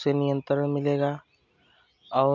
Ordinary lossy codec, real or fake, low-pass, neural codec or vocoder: none; real; 7.2 kHz; none